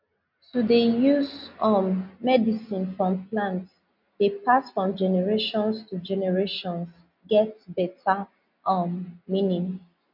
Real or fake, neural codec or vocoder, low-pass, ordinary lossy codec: real; none; 5.4 kHz; none